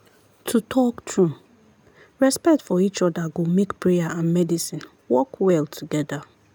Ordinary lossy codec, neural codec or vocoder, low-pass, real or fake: none; none; none; real